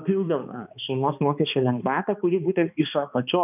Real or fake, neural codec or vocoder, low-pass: fake; codec, 16 kHz, 2 kbps, X-Codec, HuBERT features, trained on balanced general audio; 3.6 kHz